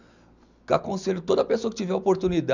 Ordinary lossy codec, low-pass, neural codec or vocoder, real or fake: none; 7.2 kHz; none; real